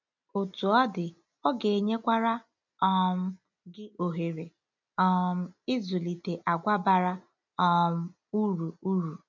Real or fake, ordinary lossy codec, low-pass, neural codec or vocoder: real; none; 7.2 kHz; none